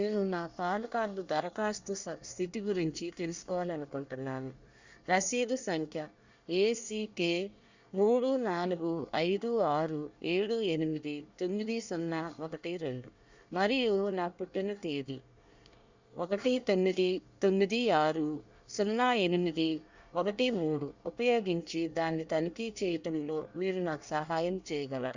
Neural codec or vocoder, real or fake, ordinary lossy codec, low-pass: codec, 24 kHz, 1 kbps, SNAC; fake; Opus, 64 kbps; 7.2 kHz